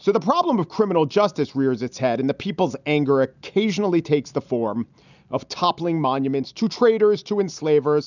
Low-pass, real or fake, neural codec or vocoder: 7.2 kHz; real; none